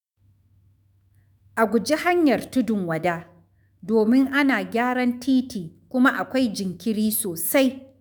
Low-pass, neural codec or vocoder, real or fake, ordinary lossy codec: none; autoencoder, 48 kHz, 128 numbers a frame, DAC-VAE, trained on Japanese speech; fake; none